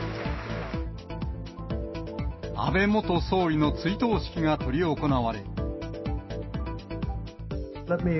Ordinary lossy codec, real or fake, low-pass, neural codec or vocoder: MP3, 24 kbps; real; 7.2 kHz; none